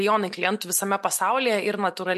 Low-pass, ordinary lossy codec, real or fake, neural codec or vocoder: 14.4 kHz; MP3, 64 kbps; real; none